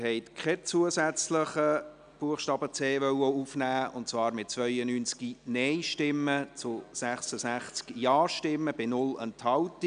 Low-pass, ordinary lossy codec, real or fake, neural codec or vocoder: 9.9 kHz; none; real; none